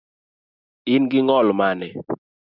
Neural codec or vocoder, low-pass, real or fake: none; 5.4 kHz; real